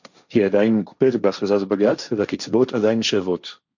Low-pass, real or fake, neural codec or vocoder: 7.2 kHz; fake; codec, 16 kHz, 1.1 kbps, Voila-Tokenizer